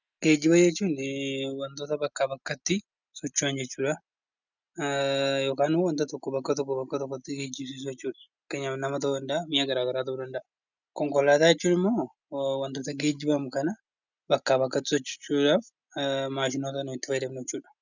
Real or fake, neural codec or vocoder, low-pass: real; none; 7.2 kHz